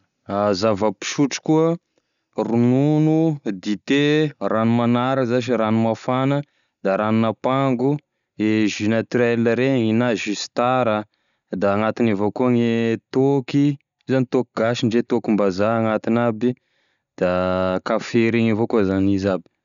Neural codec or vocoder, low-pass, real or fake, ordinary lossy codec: none; 7.2 kHz; real; none